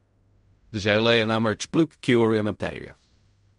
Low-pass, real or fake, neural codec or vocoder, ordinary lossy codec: 10.8 kHz; fake; codec, 16 kHz in and 24 kHz out, 0.4 kbps, LongCat-Audio-Codec, fine tuned four codebook decoder; MP3, 64 kbps